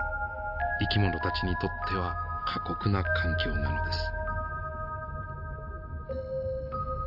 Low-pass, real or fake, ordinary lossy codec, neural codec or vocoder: 5.4 kHz; real; AAC, 48 kbps; none